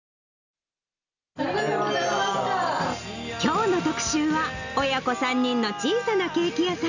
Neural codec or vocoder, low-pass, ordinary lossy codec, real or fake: none; 7.2 kHz; none; real